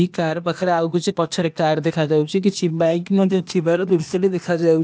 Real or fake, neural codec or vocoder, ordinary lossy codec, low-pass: fake; codec, 16 kHz, 0.8 kbps, ZipCodec; none; none